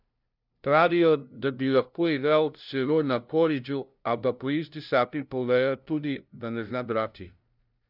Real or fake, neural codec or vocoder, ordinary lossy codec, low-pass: fake; codec, 16 kHz, 0.5 kbps, FunCodec, trained on LibriTTS, 25 frames a second; none; 5.4 kHz